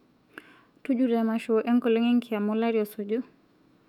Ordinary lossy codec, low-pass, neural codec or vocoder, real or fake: none; 19.8 kHz; autoencoder, 48 kHz, 128 numbers a frame, DAC-VAE, trained on Japanese speech; fake